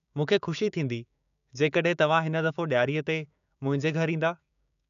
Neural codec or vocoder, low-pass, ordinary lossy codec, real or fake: codec, 16 kHz, 6 kbps, DAC; 7.2 kHz; none; fake